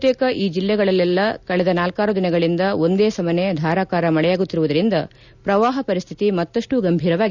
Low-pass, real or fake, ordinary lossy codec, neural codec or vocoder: 7.2 kHz; real; none; none